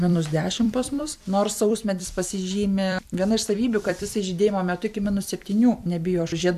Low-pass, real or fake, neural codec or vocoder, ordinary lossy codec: 14.4 kHz; fake; vocoder, 44.1 kHz, 128 mel bands every 256 samples, BigVGAN v2; AAC, 96 kbps